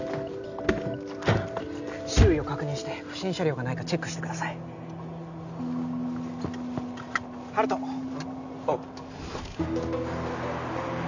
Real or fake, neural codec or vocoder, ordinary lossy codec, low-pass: real; none; none; 7.2 kHz